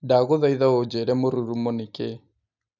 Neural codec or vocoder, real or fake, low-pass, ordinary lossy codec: none; real; 7.2 kHz; none